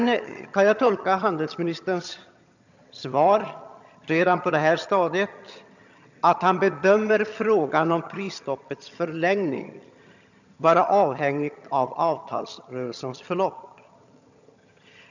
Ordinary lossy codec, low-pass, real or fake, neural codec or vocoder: none; 7.2 kHz; fake; vocoder, 22.05 kHz, 80 mel bands, HiFi-GAN